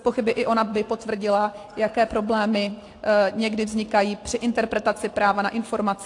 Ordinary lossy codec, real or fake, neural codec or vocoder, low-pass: AAC, 48 kbps; real; none; 10.8 kHz